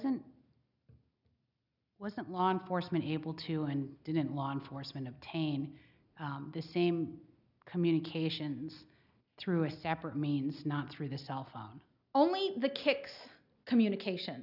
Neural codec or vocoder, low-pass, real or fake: none; 5.4 kHz; real